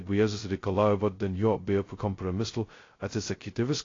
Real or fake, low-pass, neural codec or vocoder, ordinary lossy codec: fake; 7.2 kHz; codec, 16 kHz, 0.2 kbps, FocalCodec; AAC, 32 kbps